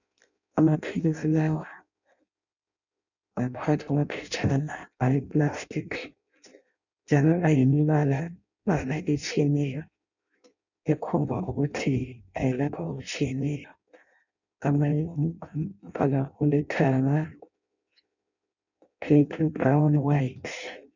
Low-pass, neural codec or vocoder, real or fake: 7.2 kHz; codec, 16 kHz in and 24 kHz out, 0.6 kbps, FireRedTTS-2 codec; fake